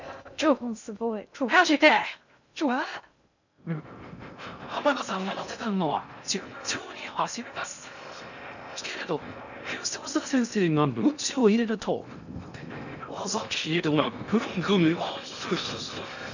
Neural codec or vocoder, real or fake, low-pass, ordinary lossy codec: codec, 16 kHz in and 24 kHz out, 0.6 kbps, FocalCodec, streaming, 2048 codes; fake; 7.2 kHz; none